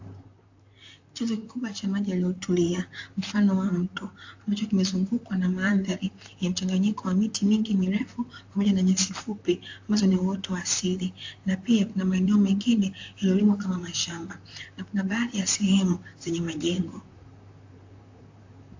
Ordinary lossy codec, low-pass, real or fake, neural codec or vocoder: AAC, 48 kbps; 7.2 kHz; fake; vocoder, 44.1 kHz, 128 mel bands, Pupu-Vocoder